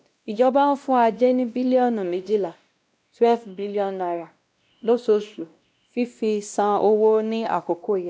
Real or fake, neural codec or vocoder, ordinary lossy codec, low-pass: fake; codec, 16 kHz, 1 kbps, X-Codec, WavLM features, trained on Multilingual LibriSpeech; none; none